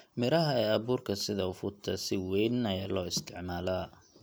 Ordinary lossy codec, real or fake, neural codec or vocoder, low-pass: none; real; none; none